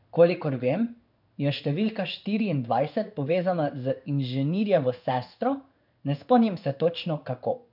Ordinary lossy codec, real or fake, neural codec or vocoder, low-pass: none; fake; codec, 16 kHz in and 24 kHz out, 1 kbps, XY-Tokenizer; 5.4 kHz